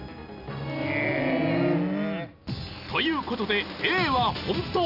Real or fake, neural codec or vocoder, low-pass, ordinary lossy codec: real; none; 5.4 kHz; none